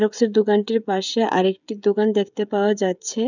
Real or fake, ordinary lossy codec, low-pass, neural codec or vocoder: fake; none; 7.2 kHz; codec, 16 kHz, 16 kbps, FreqCodec, smaller model